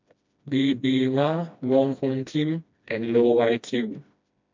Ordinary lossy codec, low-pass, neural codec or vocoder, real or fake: MP3, 64 kbps; 7.2 kHz; codec, 16 kHz, 1 kbps, FreqCodec, smaller model; fake